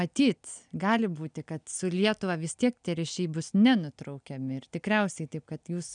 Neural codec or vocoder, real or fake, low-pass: none; real; 9.9 kHz